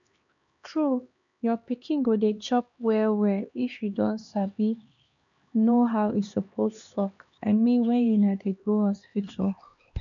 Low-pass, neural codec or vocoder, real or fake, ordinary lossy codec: 7.2 kHz; codec, 16 kHz, 2 kbps, X-Codec, HuBERT features, trained on LibriSpeech; fake; none